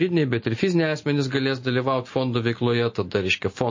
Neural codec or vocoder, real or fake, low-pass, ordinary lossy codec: none; real; 7.2 kHz; MP3, 32 kbps